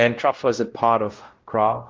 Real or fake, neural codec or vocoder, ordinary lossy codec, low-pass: fake; codec, 16 kHz, 0.5 kbps, X-Codec, WavLM features, trained on Multilingual LibriSpeech; Opus, 16 kbps; 7.2 kHz